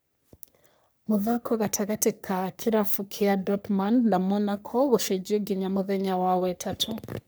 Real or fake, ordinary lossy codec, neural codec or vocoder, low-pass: fake; none; codec, 44.1 kHz, 3.4 kbps, Pupu-Codec; none